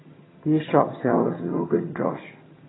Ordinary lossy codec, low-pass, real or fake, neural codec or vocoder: AAC, 16 kbps; 7.2 kHz; fake; vocoder, 22.05 kHz, 80 mel bands, HiFi-GAN